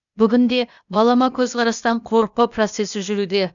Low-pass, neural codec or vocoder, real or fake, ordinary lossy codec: 7.2 kHz; codec, 16 kHz, 0.8 kbps, ZipCodec; fake; none